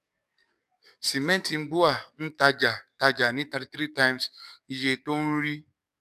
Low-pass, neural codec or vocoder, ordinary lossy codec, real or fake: 14.4 kHz; codec, 44.1 kHz, 7.8 kbps, DAC; none; fake